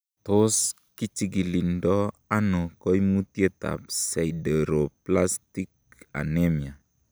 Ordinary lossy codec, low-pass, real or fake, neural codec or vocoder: none; none; real; none